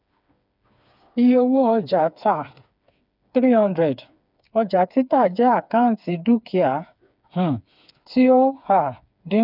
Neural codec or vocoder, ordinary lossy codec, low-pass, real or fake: codec, 16 kHz, 4 kbps, FreqCodec, smaller model; none; 5.4 kHz; fake